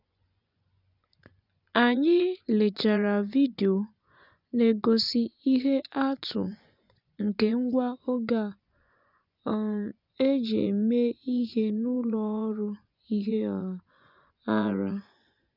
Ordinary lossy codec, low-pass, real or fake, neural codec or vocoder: none; 5.4 kHz; fake; vocoder, 24 kHz, 100 mel bands, Vocos